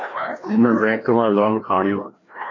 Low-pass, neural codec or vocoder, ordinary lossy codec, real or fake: 7.2 kHz; codec, 16 kHz, 1 kbps, FreqCodec, larger model; AAC, 32 kbps; fake